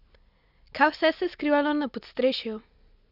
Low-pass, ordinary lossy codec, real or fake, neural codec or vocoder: 5.4 kHz; none; real; none